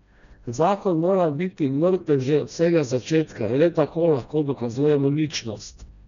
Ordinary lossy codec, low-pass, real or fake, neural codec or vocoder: none; 7.2 kHz; fake; codec, 16 kHz, 1 kbps, FreqCodec, smaller model